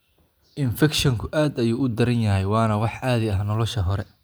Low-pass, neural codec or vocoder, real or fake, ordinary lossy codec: none; none; real; none